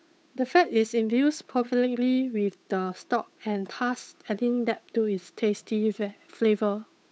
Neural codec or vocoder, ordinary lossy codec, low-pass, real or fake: codec, 16 kHz, 8 kbps, FunCodec, trained on Chinese and English, 25 frames a second; none; none; fake